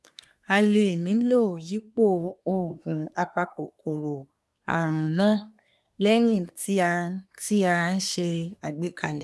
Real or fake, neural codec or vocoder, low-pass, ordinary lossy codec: fake; codec, 24 kHz, 1 kbps, SNAC; none; none